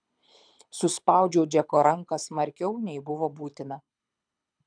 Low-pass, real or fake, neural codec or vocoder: 9.9 kHz; fake; codec, 24 kHz, 6 kbps, HILCodec